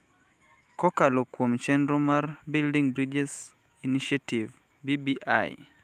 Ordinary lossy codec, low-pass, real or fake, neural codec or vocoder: Opus, 32 kbps; 14.4 kHz; fake; autoencoder, 48 kHz, 128 numbers a frame, DAC-VAE, trained on Japanese speech